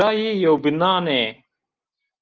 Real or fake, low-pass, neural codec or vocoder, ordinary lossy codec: real; 7.2 kHz; none; Opus, 32 kbps